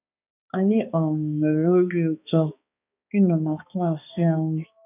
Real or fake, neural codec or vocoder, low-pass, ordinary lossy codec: fake; codec, 16 kHz, 2 kbps, X-Codec, HuBERT features, trained on balanced general audio; 3.6 kHz; none